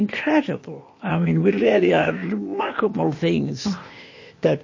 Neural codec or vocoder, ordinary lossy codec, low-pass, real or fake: codec, 16 kHz, 0.8 kbps, ZipCodec; MP3, 32 kbps; 7.2 kHz; fake